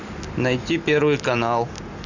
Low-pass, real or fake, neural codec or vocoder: 7.2 kHz; real; none